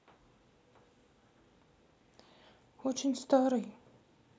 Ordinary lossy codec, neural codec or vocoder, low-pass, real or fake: none; none; none; real